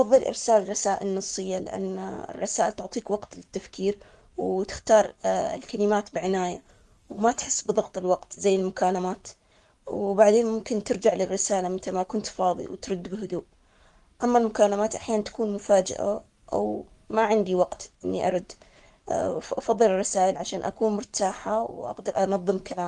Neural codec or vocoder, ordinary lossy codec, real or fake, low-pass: codec, 44.1 kHz, 7.8 kbps, Pupu-Codec; Opus, 32 kbps; fake; 10.8 kHz